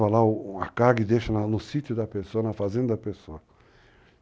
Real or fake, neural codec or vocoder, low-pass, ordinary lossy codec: real; none; none; none